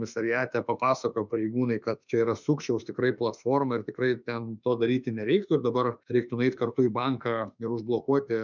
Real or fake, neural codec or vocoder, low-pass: fake; autoencoder, 48 kHz, 32 numbers a frame, DAC-VAE, trained on Japanese speech; 7.2 kHz